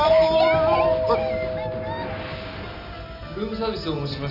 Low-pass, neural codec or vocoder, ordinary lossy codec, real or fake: 5.4 kHz; none; none; real